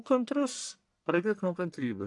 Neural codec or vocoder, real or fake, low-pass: codec, 44.1 kHz, 1.7 kbps, Pupu-Codec; fake; 10.8 kHz